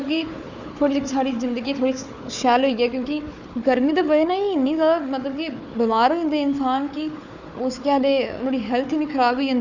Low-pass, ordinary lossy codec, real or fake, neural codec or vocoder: 7.2 kHz; none; fake; codec, 16 kHz, 4 kbps, FunCodec, trained on Chinese and English, 50 frames a second